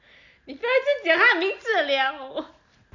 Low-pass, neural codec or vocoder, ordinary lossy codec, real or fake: 7.2 kHz; none; none; real